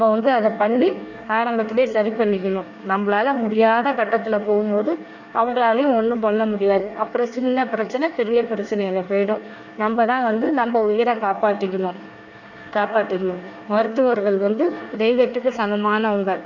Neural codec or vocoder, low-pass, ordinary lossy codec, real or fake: codec, 24 kHz, 1 kbps, SNAC; 7.2 kHz; none; fake